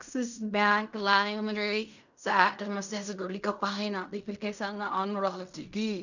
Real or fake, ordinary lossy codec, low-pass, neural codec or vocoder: fake; none; 7.2 kHz; codec, 16 kHz in and 24 kHz out, 0.4 kbps, LongCat-Audio-Codec, fine tuned four codebook decoder